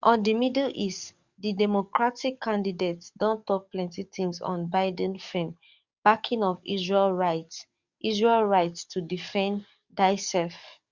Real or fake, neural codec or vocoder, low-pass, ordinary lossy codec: fake; codec, 44.1 kHz, 7.8 kbps, DAC; 7.2 kHz; Opus, 64 kbps